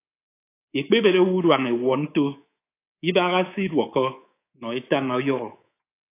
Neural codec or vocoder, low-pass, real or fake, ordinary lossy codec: codec, 24 kHz, 3.1 kbps, DualCodec; 3.6 kHz; fake; AAC, 24 kbps